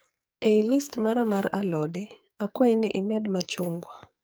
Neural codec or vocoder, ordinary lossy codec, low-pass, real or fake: codec, 44.1 kHz, 2.6 kbps, SNAC; none; none; fake